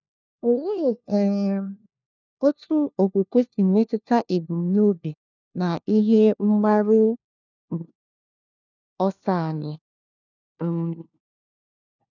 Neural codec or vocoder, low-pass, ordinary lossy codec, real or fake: codec, 16 kHz, 1 kbps, FunCodec, trained on LibriTTS, 50 frames a second; 7.2 kHz; none; fake